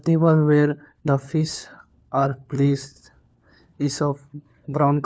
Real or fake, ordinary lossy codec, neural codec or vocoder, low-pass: fake; none; codec, 16 kHz, 4 kbps, FunCodec, trained on LibriTTS, 50 frames a second; none